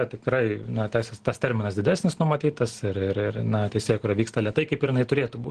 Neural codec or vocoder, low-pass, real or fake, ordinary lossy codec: none; 9.9 kHz; real; Opus, 16 kbps